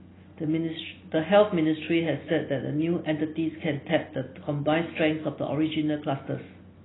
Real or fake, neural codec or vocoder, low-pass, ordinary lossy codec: real; none; 7.2 kHz; AAC, 16 kbps